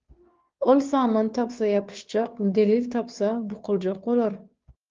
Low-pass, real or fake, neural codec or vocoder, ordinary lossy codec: 7.2 kHz; fake; codec, 16 kHz, 2 kbps, FunCodec, trained on Chinese and English, 25 frames a second; Opus, 32 kbps